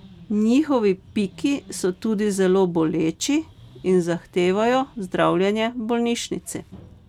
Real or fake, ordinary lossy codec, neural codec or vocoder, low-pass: real; none; none; 19.8 kHz